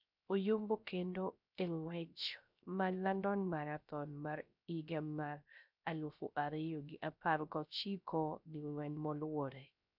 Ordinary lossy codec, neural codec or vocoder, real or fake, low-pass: none; codec, 16 kHz, 0.3 kbps, FocalCodec; fake; 5.4 kHz